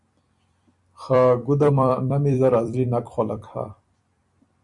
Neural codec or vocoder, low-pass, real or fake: vocoder, 44.1 kHz, 128 mel bands every 256 samples, BigVGAN v2; 10.8 kHz; fake